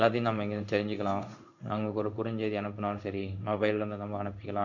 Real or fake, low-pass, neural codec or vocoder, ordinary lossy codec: fake; 7.2 kHz; codec, 16 kHz in and 24 kHz out, 1 kbps, XY-Tokenizer; none